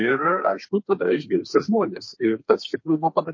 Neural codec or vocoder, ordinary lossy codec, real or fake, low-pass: codec, 32 kHz, 1.9 kbps, SNAC; MP3, 32 kbps; fake; 7.2 kHz